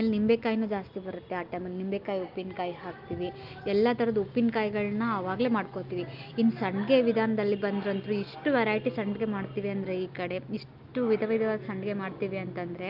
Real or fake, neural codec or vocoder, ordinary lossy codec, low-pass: real; none; Opus, 32 kbps; 5.4 kHz